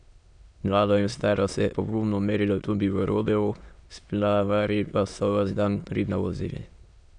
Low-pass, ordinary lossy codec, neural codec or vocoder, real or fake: 9.9 kHz; none; autoencoder, 22.05 kHz, a latent of 192 numbers a frame, VITS, trained on many speakers; fake